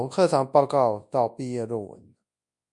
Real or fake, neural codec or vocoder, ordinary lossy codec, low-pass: fake; codec, 24 kHz, 0.9 kbps, WavTokenizer, large speech release; MP3, 64 kbps; 10.8 kHz